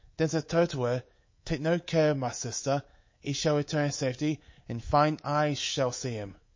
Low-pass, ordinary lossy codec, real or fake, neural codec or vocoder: 7.2 kHz; MP3, 32 kbps; fake; codec, 24 kHz, 3.1 kbps, DualCodec